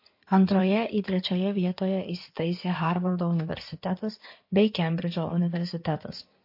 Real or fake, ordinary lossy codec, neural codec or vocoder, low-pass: fake; MP3, 32 kbps; codec, 16 kHz in and 24 kHz out, 2.2 kbps, FireRedTTS-2 codec; 5.4 kHz